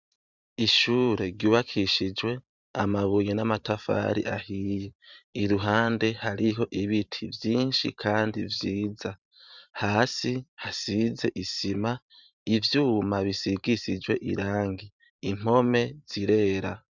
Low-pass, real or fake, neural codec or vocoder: 7.2 kHz; fake; vocoder, 44.1 kHz, 128 mel bands every 512 samples, BigVGAN v2